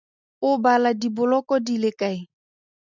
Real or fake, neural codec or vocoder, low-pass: real; none; 7.2 kHz